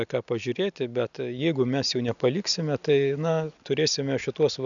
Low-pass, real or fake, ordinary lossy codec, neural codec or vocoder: 7.2 kHz; real; MP3, 96 kbps; none